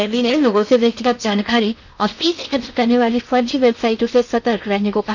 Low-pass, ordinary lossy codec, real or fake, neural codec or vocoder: 7.2 kHz; none; fake; codec, 16 kHz in and 24 kHz out, 0.8 kbps, FocalCodec, streaming, 65536 codes